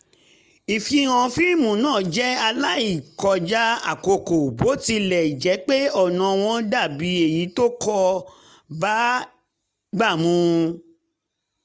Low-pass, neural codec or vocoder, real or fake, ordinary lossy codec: none; none; real; none